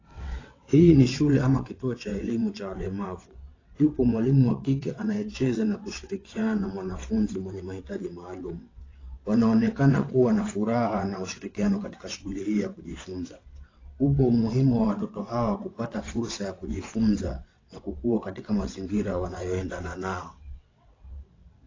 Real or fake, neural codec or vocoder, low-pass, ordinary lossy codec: fake; vocoder, 22.05 kHz, 80 mel bands, WaveNeXt; 7.2 kHz; AAC, 32 kbps